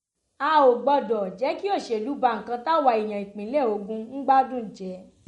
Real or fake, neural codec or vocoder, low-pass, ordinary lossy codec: real; none; 19.8 kHz; MP3, 48 kbps